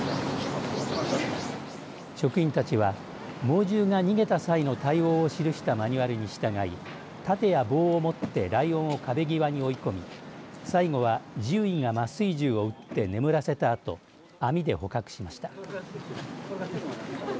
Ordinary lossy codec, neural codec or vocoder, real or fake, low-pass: none; none; real; none